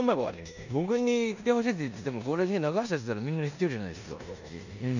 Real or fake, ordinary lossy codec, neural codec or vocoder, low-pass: fake; none; codec, 16 kHz in and 24 kHz out, 0.9 kbps, LongCat-Audio-Codec, four codebook decoder; 7.2 kHz